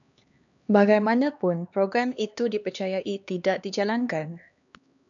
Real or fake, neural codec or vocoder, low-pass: fake; codec, 16 kHz, 2 kbps, X-Codec, HuBERT features, trained on LibriSpeech; 7.2 kHz